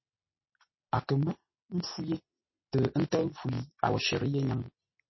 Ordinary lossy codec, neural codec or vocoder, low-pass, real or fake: MP3, 24 kbps; none; 7.2 kHz; real